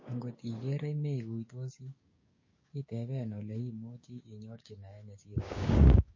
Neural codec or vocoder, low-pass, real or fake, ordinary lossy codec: codec, 16 kHz, 6 kbps, DAC; 7.2 kHz; fake; MP3, 32 kbps